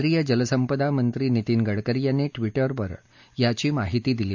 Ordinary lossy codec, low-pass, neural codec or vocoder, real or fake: none; 7.2 kHz; none; real